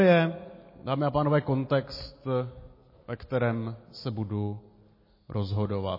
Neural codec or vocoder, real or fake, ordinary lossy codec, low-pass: none; real; MP3, 24 kbps; 5.4 kHz